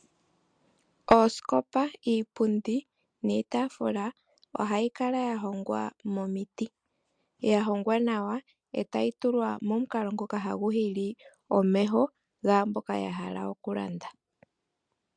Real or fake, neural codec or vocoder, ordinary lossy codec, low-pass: real; none; MP3, 64 kbps; 9.9 kHz